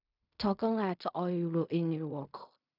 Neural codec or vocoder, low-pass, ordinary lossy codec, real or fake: codec, 16 kHz in and 24 kHz out, 0.4 kbps, LongCat-Audio-Codec, fine tuned four codebook decoder; 5.4 kHz; none; fake